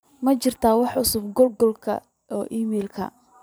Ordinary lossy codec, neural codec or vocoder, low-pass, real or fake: none; vocoder, 44.1 kHz, 128 mel bands every 512 samples, BigVGAN v2; none; fake